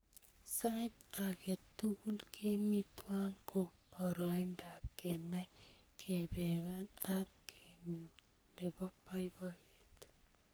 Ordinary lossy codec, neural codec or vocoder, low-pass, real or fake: none; codec, 44.1 kHz, 3.4 kbps, Pupu-Codec; none; fake